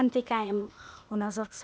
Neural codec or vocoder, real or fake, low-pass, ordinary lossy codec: codec, 16 kHz, 0.8 kbps, ZipCodec; fake; none; none